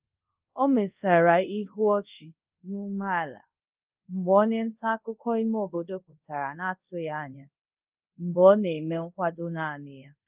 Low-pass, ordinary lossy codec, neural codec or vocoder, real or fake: 3.6 kHz; Opus, 32 kbps; codec, 24 kHz, 0.5 kbps, DualCodec; fake